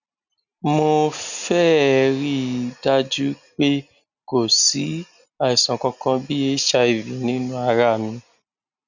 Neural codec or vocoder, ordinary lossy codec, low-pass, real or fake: none; none; 7.2 kHz; real